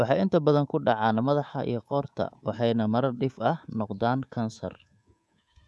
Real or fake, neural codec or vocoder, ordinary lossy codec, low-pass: fake; codec, 24 kHz, 3.1 kbps, DualCodec; none; none